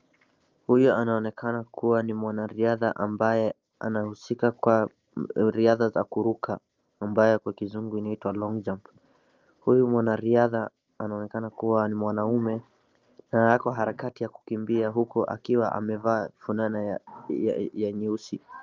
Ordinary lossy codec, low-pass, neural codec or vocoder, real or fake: Opus, 24 kbps; 7.2 kHz; none; real